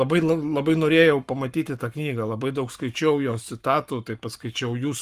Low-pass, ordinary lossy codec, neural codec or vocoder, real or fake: 14.4 kHz; Opus, 32 kbps; codec, 44.1 kHz, 7.8 kbps, Pupu-Codec; fake